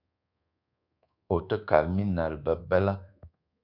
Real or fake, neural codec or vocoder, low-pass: fake; codec, 24 kHz, 1.2 kbps, DualCodec; 5.4 kHz